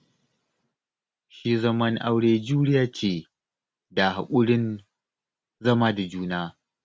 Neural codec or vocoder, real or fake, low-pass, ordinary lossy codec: none; real; none; none